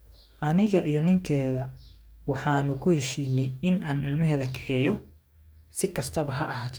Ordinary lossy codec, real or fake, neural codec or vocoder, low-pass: none; fake; codec, 44.1 kHz, 2.6 kbps, DAC; none